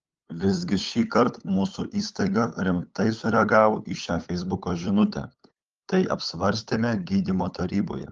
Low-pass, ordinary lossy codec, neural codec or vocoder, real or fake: 7.2 kHz; Opus, 32 kbps; codec, 16 kHz, 8 kbps, FunCodec, trained on LibriTTS, 25 frames a second; fake